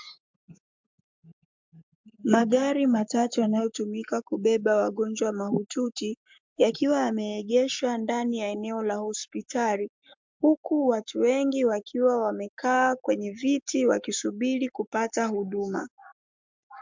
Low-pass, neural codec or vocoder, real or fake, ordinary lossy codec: 7.2 kHz; codec, 44.1 kHz, 7.8 kbps, Pupu-Codec; fake; MP3, 64 kbps